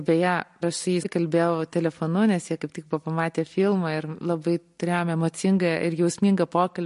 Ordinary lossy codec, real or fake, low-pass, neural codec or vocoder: MP3, 48 kbps; real; 14.4 kHz; none